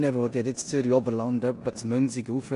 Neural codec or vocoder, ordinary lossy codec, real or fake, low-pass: codec, 16 kHz in and 24 kHz out, 0.9 kbps, LongCat-Audio-Codec, four codebook decoder; AAC, 48 kbps; fake; 10.8 kHz